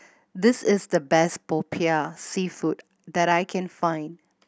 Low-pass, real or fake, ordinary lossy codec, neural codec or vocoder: none; real; none; none